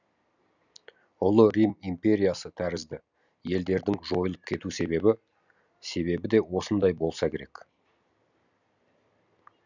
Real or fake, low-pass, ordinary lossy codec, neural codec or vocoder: real; 7.2 kHz; none; none